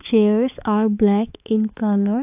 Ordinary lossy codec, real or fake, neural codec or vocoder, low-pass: AAC, 32 kbps; fake; codec, 16 kHz, 4 kbps, X-Codec, HuBERT features, trained on balanced general audio; 3.6 kHz